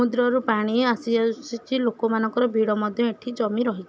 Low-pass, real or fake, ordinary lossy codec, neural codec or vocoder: none; real; none; none